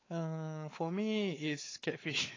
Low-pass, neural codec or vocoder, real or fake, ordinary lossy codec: 7.2 kHz; codec, 16 kHz, 4 kbps, X-Codec, WavLM features, trained on Multilingual LibriSpeech; fake; AAC, 32 kbps